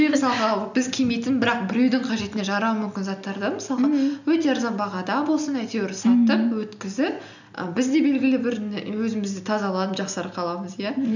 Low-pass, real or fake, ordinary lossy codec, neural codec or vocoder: 7.2 kHz; real; none; none